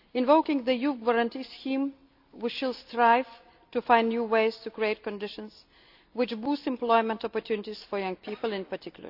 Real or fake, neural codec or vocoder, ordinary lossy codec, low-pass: real; none; AAC, 48 kbps; 5.4 kHz